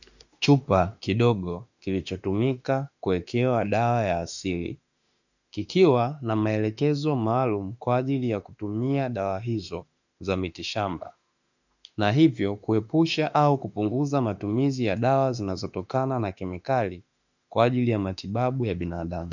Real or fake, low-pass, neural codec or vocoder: fake; 7.2 kHz; autoencoder, 48 kHz, 32 numbers a frame, DAC-VAE, trained on Japanese speech